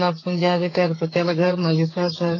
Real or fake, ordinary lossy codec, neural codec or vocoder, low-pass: fake; AAC, 32 kbps; codec, 44.1 kHz, 2.6 kbps, SNAC; 7.2 kHz